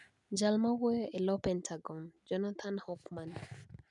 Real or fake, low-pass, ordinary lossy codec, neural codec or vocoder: real; 10.8 kHz; none; none